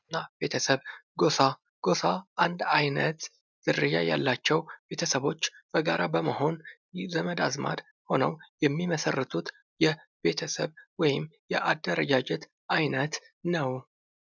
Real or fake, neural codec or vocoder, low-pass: real; none; 7.2 kHz